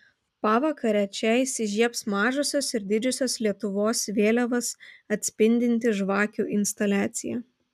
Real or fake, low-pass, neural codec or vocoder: real; 14.4 kHz; none